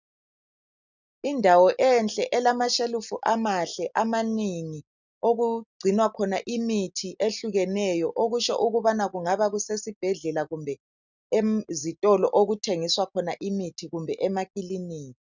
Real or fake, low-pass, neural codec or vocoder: real; 7.2 kHz; none